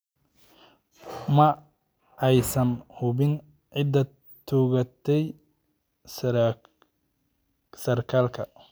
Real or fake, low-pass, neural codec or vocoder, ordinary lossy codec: real; none; none; none